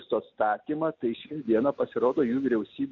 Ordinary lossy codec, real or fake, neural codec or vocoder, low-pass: MP3, 48 kbps; real; none; 7.2 kHz